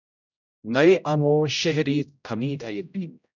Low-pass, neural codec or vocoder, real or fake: 7.2 kHz; codec, 16 kHz, 0.5 kbps, X-Codec, HuBERT features, trained on general audio; fake